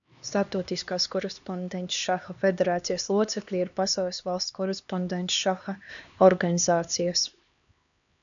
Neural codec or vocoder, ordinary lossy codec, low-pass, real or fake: codec, 16 kHz, 2 kbps, X-Codec, HuBERT features, trained on LibriSpeech; MP3, 96 kbps; 7.2 kHz; fake